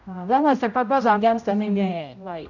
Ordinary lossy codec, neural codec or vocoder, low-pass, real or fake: none; codec, 16 kHz, 0.5 kbps, X-Codec, HuBERT features, trained on general audio; 7.2 kHz; fake